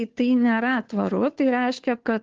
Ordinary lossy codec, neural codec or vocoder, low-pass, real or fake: Opus, 16 kbps; codec, 16 kHz, 2 kbps, FunCodec, trained on Chinese and English, 25 frames a second; 7.2 kHz; fake